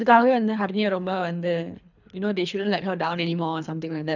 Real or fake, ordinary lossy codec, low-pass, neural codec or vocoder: fake; none; 7.2 kHz; codec, 24 kHz, 3 kbps, HILCodec